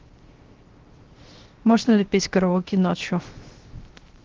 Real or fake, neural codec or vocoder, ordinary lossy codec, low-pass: fake; codec, 16 kHz, 0.3 kbps, FocalCodec; Opus, 16 kbps; 7.2 kHz